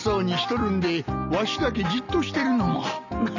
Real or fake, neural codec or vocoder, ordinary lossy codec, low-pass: real; none; none; 7.2 kHz